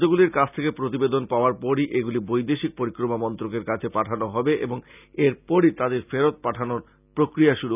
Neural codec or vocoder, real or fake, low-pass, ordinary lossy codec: none; real; 3.6 kHz; none